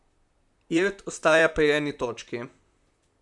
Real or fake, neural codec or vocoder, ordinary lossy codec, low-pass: fake; vocoder, 44.1 kHz, 128 mel bands, Pupu-Vocoder; MP3, 96 kbps; 10.8 kHz